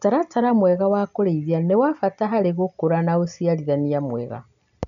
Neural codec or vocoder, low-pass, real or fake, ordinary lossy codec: none; 7.2 kHz; real; MP3, 96 kbps